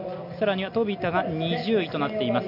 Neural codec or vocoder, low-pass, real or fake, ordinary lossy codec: none; 5.4 kHz; real; none